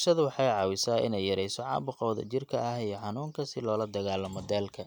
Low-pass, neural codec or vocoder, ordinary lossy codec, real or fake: 19.8 kHz; none; none; real